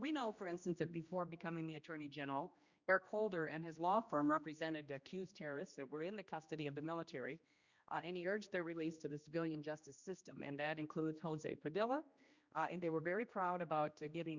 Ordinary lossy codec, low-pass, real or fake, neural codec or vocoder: Opus, 64 kbps; 7.2 kHz; fake; codec, 16 kHz, 1 kbps, X-Codec, HuBERT features, trained on general audio